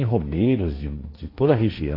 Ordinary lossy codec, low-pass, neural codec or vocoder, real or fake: AAC, 24 kbps; 5.4 kHz; codec, 16 kHz, 1.1 kbps, Voila-Tokenizer; fake